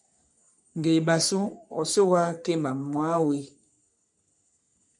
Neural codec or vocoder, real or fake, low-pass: codec, 44.1 kHz, 3.4 kbps, Pupu-Codec; fake; 10.8 kHz